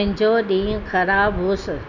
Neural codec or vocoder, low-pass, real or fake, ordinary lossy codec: none; 7.2 kHz; real; none